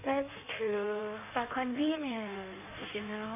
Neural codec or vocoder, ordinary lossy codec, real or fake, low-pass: codec, 16 kHz in and 24 kHz out, 1.1 kbps, FireRedTTS-2 codec; none; fake; 3.6 kHz